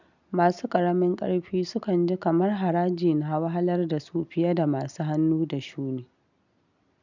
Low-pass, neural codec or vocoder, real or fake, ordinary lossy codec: 7.2 kHz; none; real; none